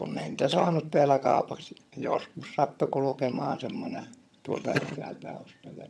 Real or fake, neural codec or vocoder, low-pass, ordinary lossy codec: fake; vocoder, 22.05 kHz, 80 mel bands, HiFi-GAN; none; none